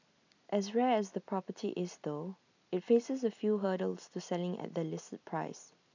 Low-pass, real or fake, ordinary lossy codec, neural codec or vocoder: 7.2 kHz; real; none; none